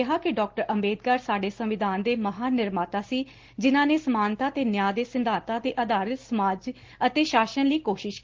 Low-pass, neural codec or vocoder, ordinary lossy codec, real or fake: 7.2 kHz; none; Opus, 16 kbps; real